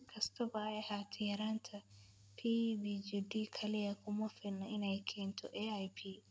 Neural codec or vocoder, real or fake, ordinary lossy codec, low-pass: none; real; none; none